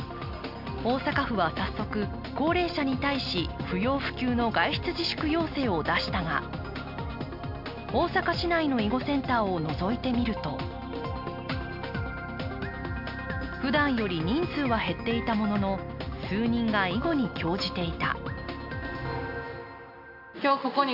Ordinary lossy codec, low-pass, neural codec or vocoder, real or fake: MP3, 48 kbps; 5.4 kHz; none; real